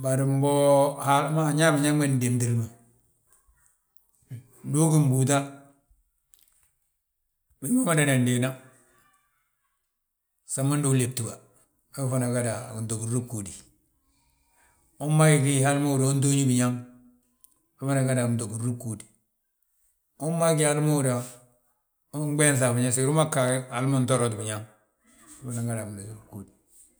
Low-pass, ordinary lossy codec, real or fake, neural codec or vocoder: none; none; real; none